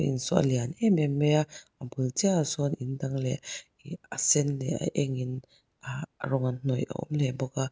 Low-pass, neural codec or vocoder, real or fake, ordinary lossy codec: none; none; real; none